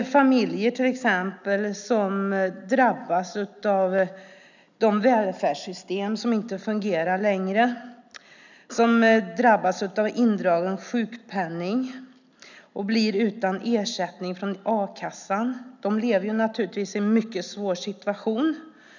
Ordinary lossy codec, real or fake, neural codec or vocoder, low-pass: none; real; none; 7.2 kHz